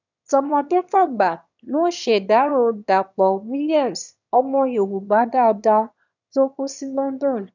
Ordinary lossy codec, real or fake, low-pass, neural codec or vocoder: none; fake; 7.2 kHz; autoencoder, 22.05 kHz, a latent of 192 numbers a frame, VITS, trained on one speaker